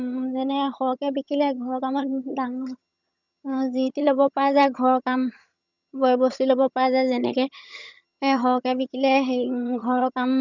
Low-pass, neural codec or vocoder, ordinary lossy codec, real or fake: 7.2 kHz; vocoder, 22.05 kHz, 80 mel bands, HiFi-GAN; none; fake